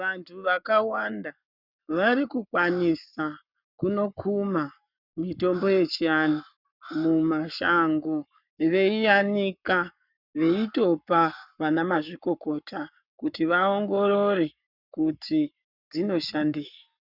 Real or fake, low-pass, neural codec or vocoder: fake; 5.4 kHz; codec, 44.1 kHz, 7.8 kbps, Pupu-Codec